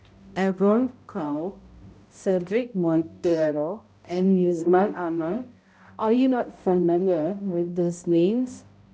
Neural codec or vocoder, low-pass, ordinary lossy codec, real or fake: codec, 16 kHz, 0.5 kbps, X-Codec, HuBERT features, trained on balanced general audio; none; none; fake